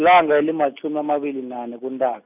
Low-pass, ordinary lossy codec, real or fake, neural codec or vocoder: 3.6 kHz; none; real; none